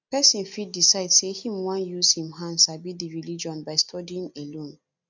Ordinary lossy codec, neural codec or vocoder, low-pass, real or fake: none; none; 7.2 kHz; real